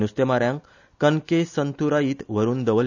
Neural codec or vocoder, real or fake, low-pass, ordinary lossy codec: none; real; 7.2 kHz; none